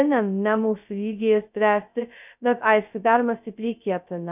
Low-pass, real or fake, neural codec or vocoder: 3.6 kHz; fake; codec, 16 kHz, 0.2 kbps, FocalCodec